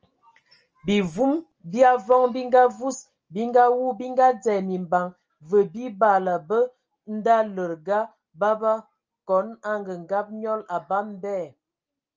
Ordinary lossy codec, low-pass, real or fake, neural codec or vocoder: Opus, 24 kbps; 7.2 kHz; real; none